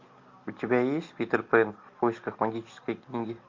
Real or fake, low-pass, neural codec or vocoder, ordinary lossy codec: real; 7.2 kHz; none; MP3, 48 kbps